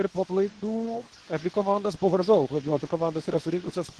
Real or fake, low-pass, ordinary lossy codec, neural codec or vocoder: fake; 10.8 kHz; Opus, 16 kbps; codec, 24 kHz, 0.9 kbps, WavTokenizer, medium speech release version 2